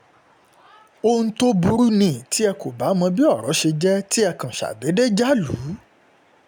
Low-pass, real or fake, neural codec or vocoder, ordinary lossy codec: none; real; none; none